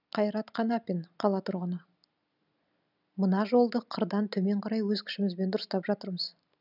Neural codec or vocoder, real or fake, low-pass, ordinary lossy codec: none; real; 5.4 kHz; none